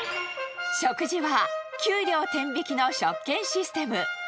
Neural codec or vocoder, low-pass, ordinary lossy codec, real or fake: none; none; none; real